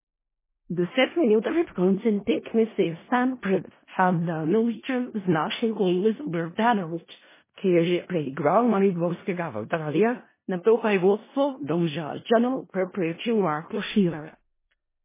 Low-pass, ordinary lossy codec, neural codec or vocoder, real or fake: 3.6 kHz; MP3, 16 kbps; codec, 16 kHz in and 24 kHz out, 0.4 kbps, LongCat-Audio-Codec, four codebook decoder; fake